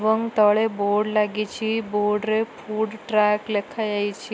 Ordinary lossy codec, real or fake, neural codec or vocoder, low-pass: none; real; none; none